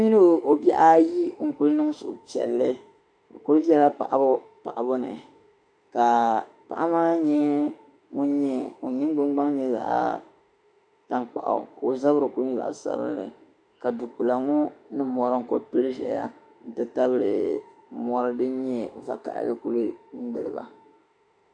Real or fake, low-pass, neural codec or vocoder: fake; 9.9 kHz; autoencoder, 48 kHz, 32 numbers a frame, DAC-VAE, trained on Japanese speech